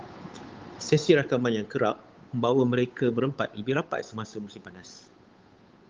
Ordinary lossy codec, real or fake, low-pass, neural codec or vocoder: Opus, 32 kbps; fake; 7.2 kHz; codec, 16 kHz, 8 kbps, FunCodec, trained on Chinese and English, 25 frames a second